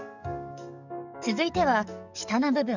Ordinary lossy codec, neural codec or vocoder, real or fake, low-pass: none; codec, 44.1 kHz, 7.8 kbps, DAC; fake; 7.2 kHz